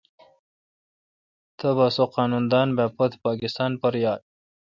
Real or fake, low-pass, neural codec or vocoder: real; 7.2 kHz; none